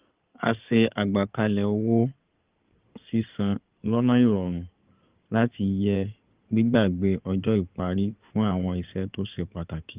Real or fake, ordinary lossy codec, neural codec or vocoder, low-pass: fake; Opus, 32 kbps; codec, 16 kHz in and 24 kHz out, 2.2 kbps, FireRedTTS-2 codec; 3.6 kHz